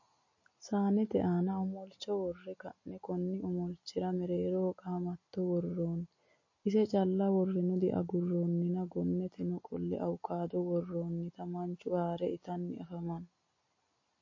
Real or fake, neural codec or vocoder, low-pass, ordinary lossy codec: real; none; 7.2 kHz; MP3, 32 kbps